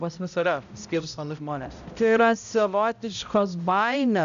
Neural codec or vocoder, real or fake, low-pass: codec, 16 kHz, 0.5 kbps, X-Codec, HuBERT features, trained on balanced general audio; fake; 7.2 kHz